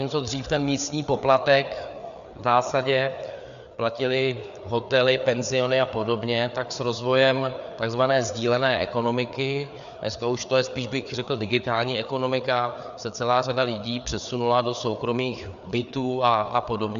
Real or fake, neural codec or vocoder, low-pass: fake; codec, 16 kHz, 4 kbps, FreqCodec, larger model; 7.2 kHz